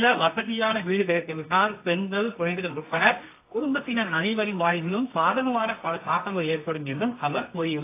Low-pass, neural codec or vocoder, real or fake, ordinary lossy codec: 3.6 kHz; codec, 24 kHz, 0.9 kbps, WavTokenizer, medium music audio release; fake; AAC, 24 kbps